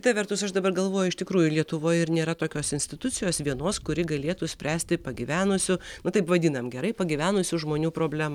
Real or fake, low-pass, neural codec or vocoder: real; 19.8 kHz; none